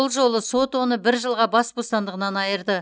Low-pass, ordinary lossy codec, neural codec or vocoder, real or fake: none; none; none; real